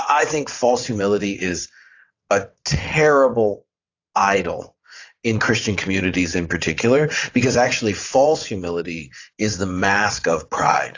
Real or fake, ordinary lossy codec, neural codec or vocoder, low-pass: fake; AAC, 48 kbps; vocoder, 22.05 kHz, 80 mel bands, WaveNeXt; 7.2 kHz